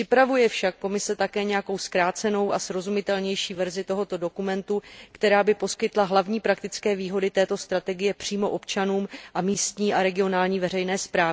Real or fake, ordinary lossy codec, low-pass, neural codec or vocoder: real; none; none; none